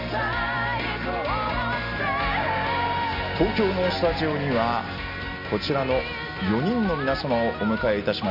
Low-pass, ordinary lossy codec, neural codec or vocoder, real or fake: 5.4 kHz; none; none; real